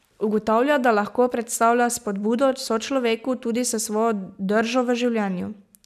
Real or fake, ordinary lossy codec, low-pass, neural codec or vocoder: real; none; 14.4 kHz; none